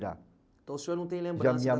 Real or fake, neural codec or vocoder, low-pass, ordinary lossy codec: real; none; none; none